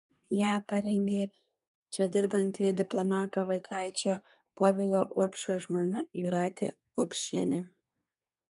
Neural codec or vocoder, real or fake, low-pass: codec, 24 kHz, 1 kbps, SNAC; fake; 10.8 kHz